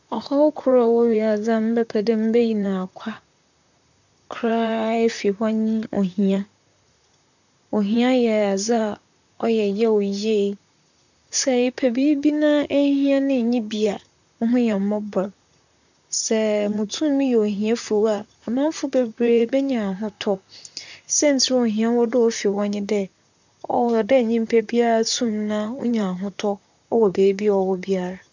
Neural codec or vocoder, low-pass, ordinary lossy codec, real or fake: vocoder, 44.1 kHz, 128 mel bands, Pupu-Vocoder; 7.2 kHz; none; fake